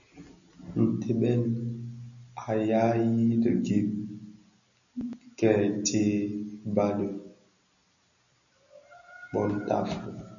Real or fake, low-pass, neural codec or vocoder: real; 7.2 kHz; none